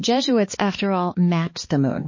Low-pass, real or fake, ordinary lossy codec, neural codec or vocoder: 7.2 kHz; fake; MP3, 32 kbps; codec, 16 kHz, 2 kbps, X-Codec, HuBERT features, trained on balanced general audio